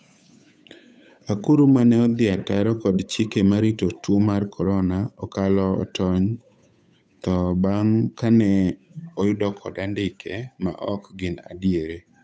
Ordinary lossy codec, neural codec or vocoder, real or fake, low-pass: none; codec, 16 kHz, 8 kbps, FunCodec, trained on Chinese and English, 25 frames a second; fake; none